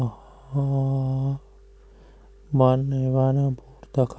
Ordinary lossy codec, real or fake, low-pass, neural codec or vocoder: none; real; none; none